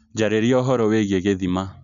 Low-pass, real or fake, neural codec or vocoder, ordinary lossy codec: 7.2 kHz; real; none; none